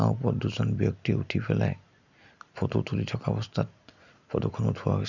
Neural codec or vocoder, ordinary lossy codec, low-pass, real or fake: none; none; 7.2 kHz; real